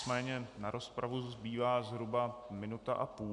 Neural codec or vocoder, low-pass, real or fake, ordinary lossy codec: none; 10.8 kHz; real; MP3, 96 kbps